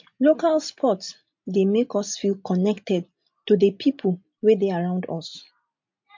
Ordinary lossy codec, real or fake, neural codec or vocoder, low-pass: MP3, 48 kbps; real; none; 7.2 kHz